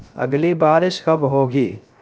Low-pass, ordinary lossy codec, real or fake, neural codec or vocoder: none; none; fake; codec, 16 kHz, 0.3 kbps, FocalCodec